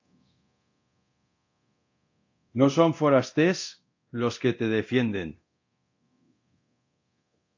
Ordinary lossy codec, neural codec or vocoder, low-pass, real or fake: AAC, 48 kbps; codec, 24 kHz, 0.9 kbps, DualCodec; 7.2 kHz; fake